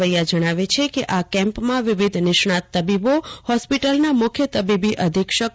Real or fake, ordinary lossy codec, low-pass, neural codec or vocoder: real; none; none; none